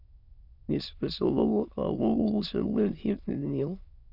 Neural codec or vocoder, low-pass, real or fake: autoencoder, 22.05 kHz, a latent of 192 numbers a frame, VITS, trained on many speakers; 5.4 kHz; fake